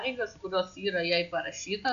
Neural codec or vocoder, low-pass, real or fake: none; 7.2 kHz; real